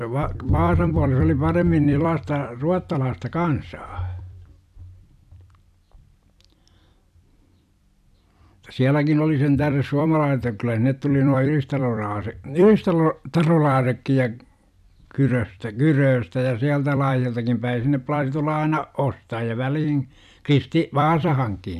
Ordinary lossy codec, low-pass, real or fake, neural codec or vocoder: none; 14.4 kHz; fake; vocoder, 44.1 kHz, 128 mel bands every 256 samples, BigVGAN v2